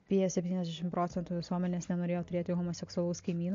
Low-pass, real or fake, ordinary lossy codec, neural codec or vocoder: 7.2 kHz; real; MP3, 48 kbps; none